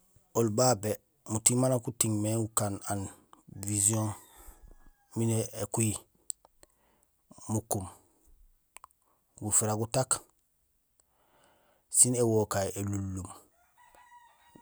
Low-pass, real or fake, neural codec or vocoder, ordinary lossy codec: none; real; none; none